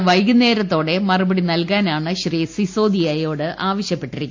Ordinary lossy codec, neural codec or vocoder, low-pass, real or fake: AAC, 48 kbps; none; 7.2 kHz; real